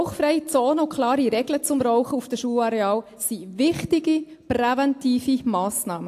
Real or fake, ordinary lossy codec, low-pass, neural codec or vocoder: real; AAC, 64 kbps; 14.4 kHz; none